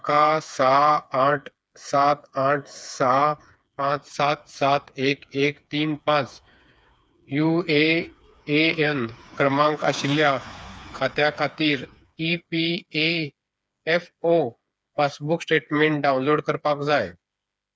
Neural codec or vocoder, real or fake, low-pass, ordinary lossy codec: codec, 16 kHz, 4 kbps, FreqCodec, smaller model; fake; none; none